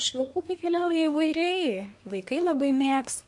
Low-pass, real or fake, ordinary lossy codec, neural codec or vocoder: 10.8 kHz; fake; MP3, 64 kbps; codec, 24 kHz, 1 kbps, SNAC